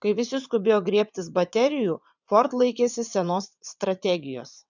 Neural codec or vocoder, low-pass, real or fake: vocoder, 22.05 kHz, 80 mel bands, WaveNeXt; 7.2 kHz; fake